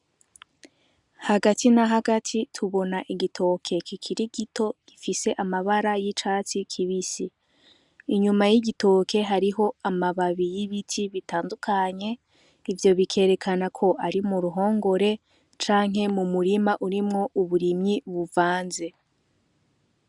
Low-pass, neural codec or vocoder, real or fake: 10.8 kHz; none; real